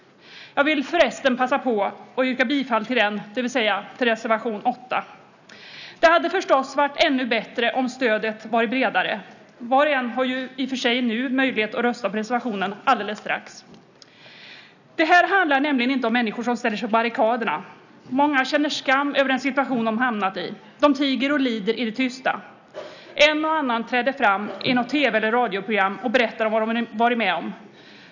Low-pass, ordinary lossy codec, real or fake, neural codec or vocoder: 7.2 kHz; none; real; none